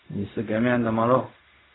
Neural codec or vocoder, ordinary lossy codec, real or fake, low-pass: codec, 16 kHz, 0.4 kbps, LongCat-Audio-Codec; AAC, 16 kbps; fake; 7.2 kHz